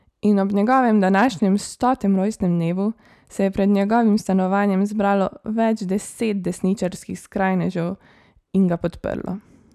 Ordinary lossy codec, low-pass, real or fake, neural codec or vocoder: none; 14.4 kHz; fake; vocoder, 44.1 kHz, 128 mel bands every 256 samples, BigVGAN v2